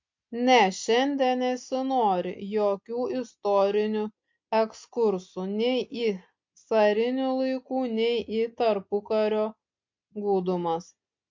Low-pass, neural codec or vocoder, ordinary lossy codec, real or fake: 7.2 kHz; none; MP3, 48 kbps; real